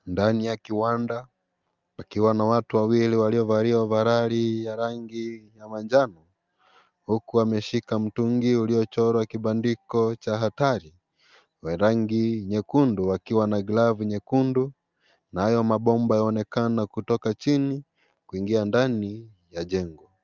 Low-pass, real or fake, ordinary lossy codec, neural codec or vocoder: 7.2 kHz; real; Opus, 24 kbps; none